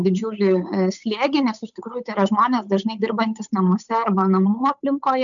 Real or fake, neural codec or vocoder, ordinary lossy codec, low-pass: fake; codec, 16 kHz, 8 kbps, FunCodec, trained on Chinese and English, 25 frames a second; MP3, 96 kbps; 7.2 kHz